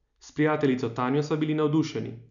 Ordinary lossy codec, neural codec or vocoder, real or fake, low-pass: none; none; real; 7.2 kHz